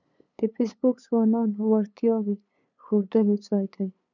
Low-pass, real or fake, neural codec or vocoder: 7.2 kHz; fake; codec, 16 kHz, 8 kbps, FunCodec, trained on LibriTTS, 25 frames a second